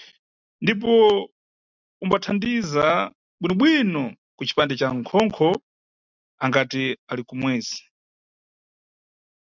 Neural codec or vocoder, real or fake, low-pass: none; real; 7.2 kHz